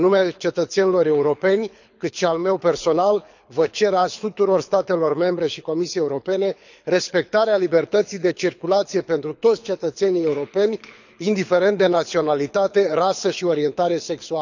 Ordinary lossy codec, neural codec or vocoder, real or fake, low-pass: none; codec, 24 kHz, 6 kbps, HILCodec; fake; 7.2 kHz